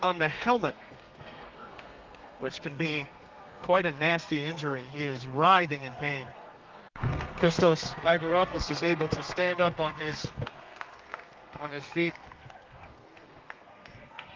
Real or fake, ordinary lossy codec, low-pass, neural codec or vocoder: fake; Opus, 24 kbps; 7.2 kHz; codec, 32 kHz, 1.9 kbps, SNAC